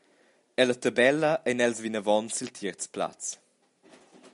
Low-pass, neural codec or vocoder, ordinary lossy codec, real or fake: 10.8 kHz; none; MP3, 96 kbps; real